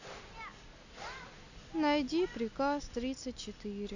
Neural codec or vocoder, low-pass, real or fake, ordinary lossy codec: none; 7.2 kHz; real; none